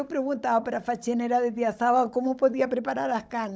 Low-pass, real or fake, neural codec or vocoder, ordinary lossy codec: none; fake; codec, 16 kHz, 16 kbps, FunCodec, trained on Chinese and English, 50 frames a second; none